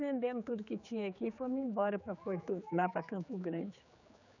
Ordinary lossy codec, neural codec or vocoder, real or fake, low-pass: none; codec, 16 kHz, 4 kbps, X-Codec, HuBERT features, trained on general audio; fake; 7.2 kHz